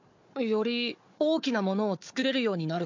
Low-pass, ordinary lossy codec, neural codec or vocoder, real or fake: 7.2 kHz; MP3, 48 kbps; codec, 16 kHz, 4 kbps, FunCodec, trained on Chinese and English, 50 frames a second; fake